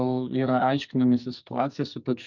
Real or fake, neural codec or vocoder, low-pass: fake; codec, 44.1 kHz, 2.6 kbps, SNAC; 7.2 kHz